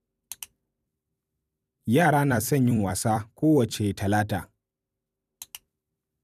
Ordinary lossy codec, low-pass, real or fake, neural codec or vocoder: none; 14.4 kHz; fake; vocoder, 44.1 kHz, 128 mel bands every 256 samples, BigVGAN v2